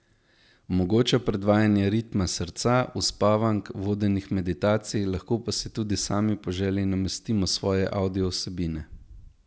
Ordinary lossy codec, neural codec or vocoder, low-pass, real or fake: none; none; none; real